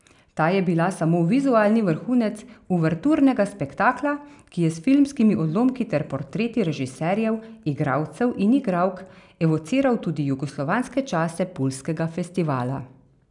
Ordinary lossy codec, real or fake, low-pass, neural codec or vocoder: none; real; 10.8 kHz; none